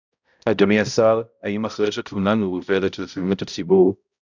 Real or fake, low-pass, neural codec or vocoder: fake; 7.2 kHz; codec, 16 kHz, 0.5 kbps, X-Codec, HuBERT features, trained on balanced general audio